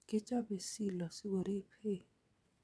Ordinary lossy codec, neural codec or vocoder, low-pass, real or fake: none; vocoder, 22.05 kHz, 80 mel bands, WaveNeXt; none; fake